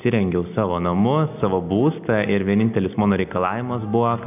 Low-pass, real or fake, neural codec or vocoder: 3.6 kHz; real; none